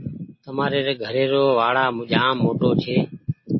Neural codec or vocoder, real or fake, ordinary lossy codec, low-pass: none; real; MP3, 24 kbps; 7.2 kHz